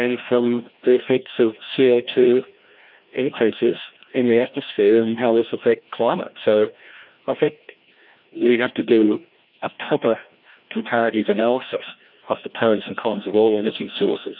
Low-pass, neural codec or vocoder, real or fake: 5.4 kHz; codec, 16 kHz, 1 kbps, FreqCodec, larger model; fake